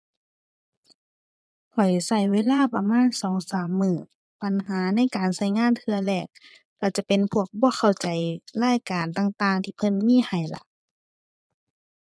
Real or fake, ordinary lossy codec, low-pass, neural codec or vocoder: fake; none; none; vocoder, 22.05 kHz, 80 mel bands, Vocos